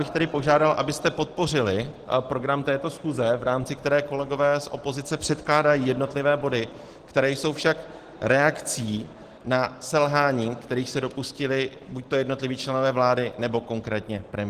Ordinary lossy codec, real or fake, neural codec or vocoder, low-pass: Opus, 16 kbps; real; none; 14.4 kHz